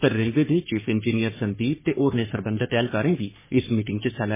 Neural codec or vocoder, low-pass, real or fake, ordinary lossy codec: codec, 44.1 kHz, 3.4 kbps, Pupu-Codec; 3.6 kHz; fake; MP3, 16 kbps